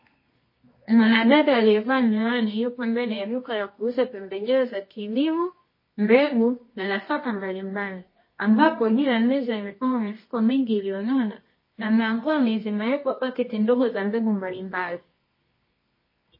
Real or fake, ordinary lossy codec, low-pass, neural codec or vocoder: fake; MP3, 24 kbps; 5.4 kHz; codec, 24 kHz, 0.9 kbps, WavTokenizer, medium music audio release